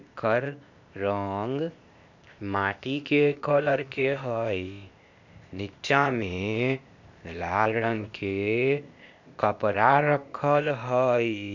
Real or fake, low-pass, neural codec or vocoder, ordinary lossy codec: fake; 7.2 kHz; codec, 16 kHz, 0.8 kbps, ZipCodec; none